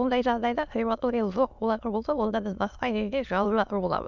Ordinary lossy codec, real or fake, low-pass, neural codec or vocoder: none; fake; 7.2 kHz; autoencoder, 22.05 kHz, a latent of 192 numbers a frame, VITS, trained on many speakers